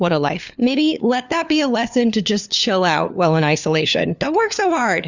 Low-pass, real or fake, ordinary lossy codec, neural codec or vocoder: 7.2 kHz; fake; Opus, 64 kbps; codec, 16 kHz, 4 kbps, FunCodec, trained on LibriTTS, 50 frames a second